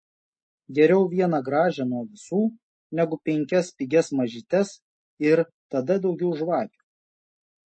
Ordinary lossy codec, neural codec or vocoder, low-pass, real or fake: MP3, 32 kbps; none; 9.9 kHz; real